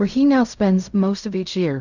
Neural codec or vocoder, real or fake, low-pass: codec, 16 kHz in and 24 kHz out, 0.4 kbps, LongCat-Audio-Codec, fine tuned four codebook decoder; fake; 7.2 kHz